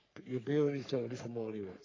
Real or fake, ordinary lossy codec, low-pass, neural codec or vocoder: fake; AAC, 32 kbps; 7.2 kHz; codec, 44.1 kHz, 3.4 kbps, Pupu-Codec